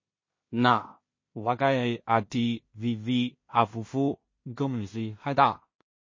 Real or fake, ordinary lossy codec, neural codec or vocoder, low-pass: fake; MP3, 32 kbps; codec, 16 kHz in and 24 kHz out, 0.4 kbps, LongCat-Audio-Codec, two codebook decoder; 7.2 kHz